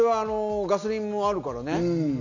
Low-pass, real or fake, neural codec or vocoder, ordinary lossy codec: 7.2 kHz; real; none; none